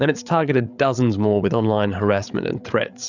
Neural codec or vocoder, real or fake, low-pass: codec, 16 kHz, 8 kbps, FreqCodec, larger model; fake; 7.2 kHz